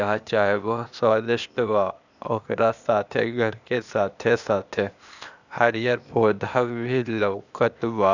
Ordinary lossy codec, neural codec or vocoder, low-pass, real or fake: none; codec, 16 kHz, 0.8 kbps, ZipCodec; 7.2 kHz; fake